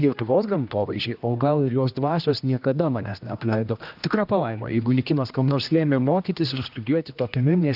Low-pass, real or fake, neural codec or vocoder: 5.4 kHz; fake; codec, 16 kHz, 1 kbps, X-Codec, HuBERT features, trained on general audio